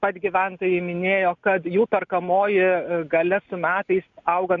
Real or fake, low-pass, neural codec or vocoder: real; 7.2 kHz; none